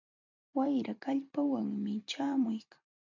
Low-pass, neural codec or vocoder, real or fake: 7.2 kHz; vocoder, 44.1 kHz, 128 mel bands every 256 samples, BigVGAN v2; fake